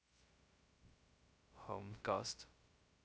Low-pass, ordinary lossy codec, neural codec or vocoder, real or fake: none; none; codec, 16 kHz, 0.2 kbps, FocalCodec; fake